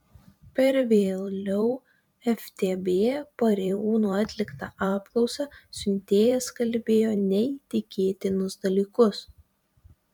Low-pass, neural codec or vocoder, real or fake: 19.8 kHz; vocoder, 44.1 kHz, 128 mel bands every 512 samples, BigVGAN v2; fake